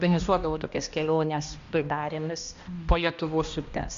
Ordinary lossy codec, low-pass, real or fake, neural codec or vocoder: MP3, 64 kbps; 7.2 kHz; fake; codec, 16 kHz, 1 kbps, X-Codec, HuBERT features, trained on balanced general audio